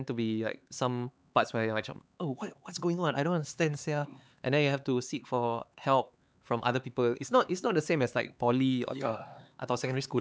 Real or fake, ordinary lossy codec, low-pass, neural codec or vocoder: fake; none; none; codec, 16 kHz, 4 kbps, X-Codec, HuBERT features, trained on LibriSpeech